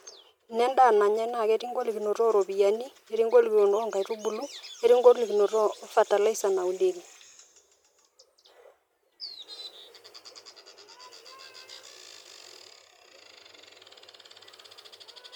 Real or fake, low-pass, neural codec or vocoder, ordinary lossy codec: real; 19.8 kHz; none; MP3, 96 kbps